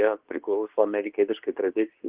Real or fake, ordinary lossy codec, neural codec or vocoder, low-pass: fake; Opus, 16 kbps; codec, 24 kHz, 0.9 kbps, WavTokenizer, medium speech release version 2; 3.6 kHz